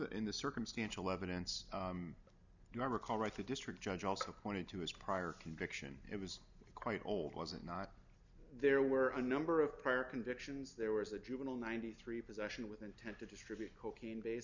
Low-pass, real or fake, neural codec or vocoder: 7.2 kHz; real; none